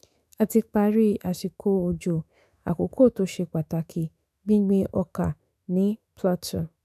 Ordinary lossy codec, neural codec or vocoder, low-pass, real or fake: none; autoencoder, 48 kHz, 128 numbers a frame, DAC-VAE, trained on Japanese speech; 14.4 kHz; fake